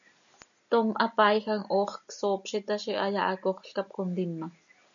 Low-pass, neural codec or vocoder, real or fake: 7.2 kHz; none; real